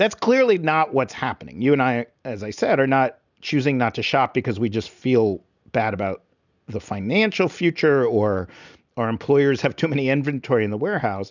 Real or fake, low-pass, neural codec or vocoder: real; 7.2 kHz; none